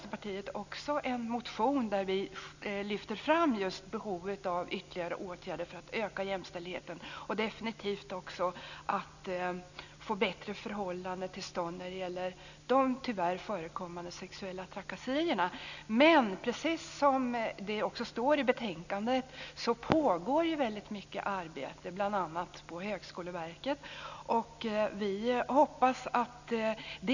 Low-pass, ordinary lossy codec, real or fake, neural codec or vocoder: 7.2 kHz; none; real; none